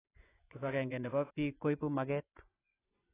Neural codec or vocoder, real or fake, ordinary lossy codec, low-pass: vocoder, 24 kHz, 100 mel bands, Vocos; fake; AAC, 16 kbps; 3.6 kHz